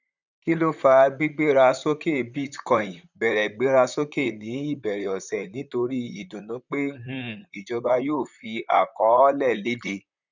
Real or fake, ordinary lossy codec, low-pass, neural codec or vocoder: fake; none; 7.2 kHz; vocoder, 44.1 kHz, 128 mel bands, Pupu-Vocoder